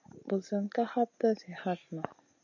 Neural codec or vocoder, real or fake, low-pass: none; real; 7.2 kHz